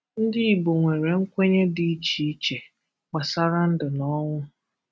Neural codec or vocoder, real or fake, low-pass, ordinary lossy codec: none; real; none; none